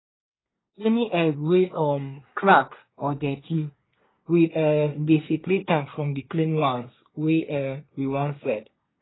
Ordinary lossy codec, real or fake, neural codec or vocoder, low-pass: AAC, 16 kbps; fake; codec, 24 kHz, 1 kbps, SNAC; 7.2 kHz